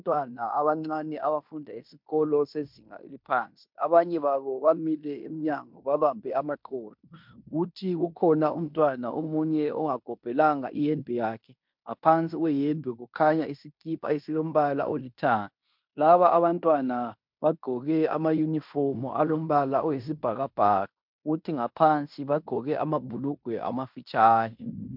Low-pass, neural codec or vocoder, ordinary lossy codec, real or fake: 5.4 kHz; codec, 16 kHz in and 24 kHz out, 0.9 kbps, LongCat-Audio-Codec, fine tuned four codebook decoder; MP3, 48 kbps; fake